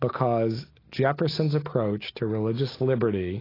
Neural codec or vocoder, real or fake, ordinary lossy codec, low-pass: none; real; AAC, 24 kbps; 5.4 kHz